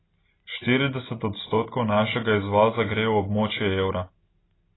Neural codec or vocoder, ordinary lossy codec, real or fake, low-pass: none; AAC, 16 kbps; real; 7.2 kHz